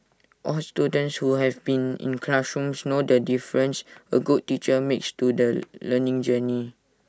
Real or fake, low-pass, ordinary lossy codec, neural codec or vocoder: real; none; none; none